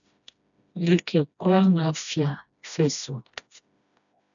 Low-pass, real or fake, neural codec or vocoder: 7.2 kHz; fake; codec, 16 kHz, 1 kbps, FreqCodec, smaller model